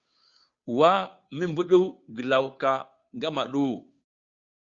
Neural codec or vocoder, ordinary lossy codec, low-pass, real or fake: codec, 16 kHz, 2 kbps, FunCodec, trained on Chinese and English, 25 frames a second; Opus, 64 kbps; 7.2 kHz; fake